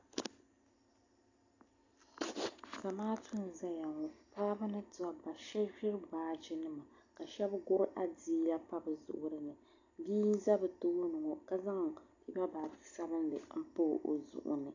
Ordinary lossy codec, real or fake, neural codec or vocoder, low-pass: AAC, 48 kbps; real; none; 7.2 kHz